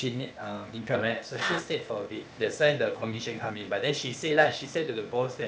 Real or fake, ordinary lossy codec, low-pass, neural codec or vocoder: fake; none; none; codec, 16 kHz, 0.8 kbps, ZipCodec